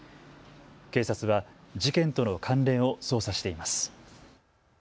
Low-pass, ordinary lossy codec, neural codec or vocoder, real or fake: none; none; none; real